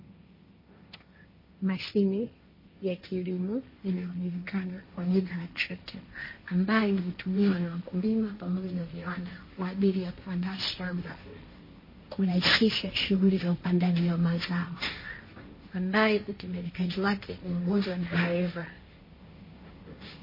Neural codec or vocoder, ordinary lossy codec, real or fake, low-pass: codec, 16 kHz, 1.1 kbps, Voila-Tokenizer; MP3, 24 kbps; fake; 5.4 kHz